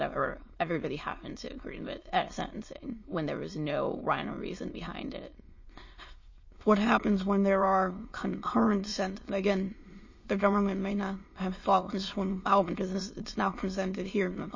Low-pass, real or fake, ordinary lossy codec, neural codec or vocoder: 7.2 kHz; fake; MP3, 32 kbps; autoencoder, 22.05 kHz, a latent of 192 numbers a frame, VITS, trained on many speakers